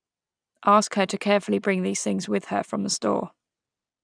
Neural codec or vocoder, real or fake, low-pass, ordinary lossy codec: none; real; 9.9 kHz; none